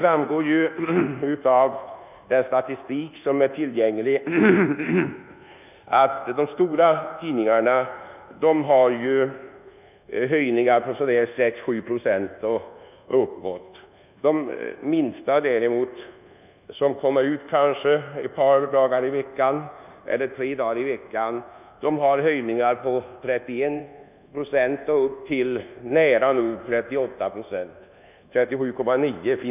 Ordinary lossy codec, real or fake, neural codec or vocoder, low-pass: none; fake; codec, 24 kHz, 1.2 kbps, DualCodec; 3.6 kHz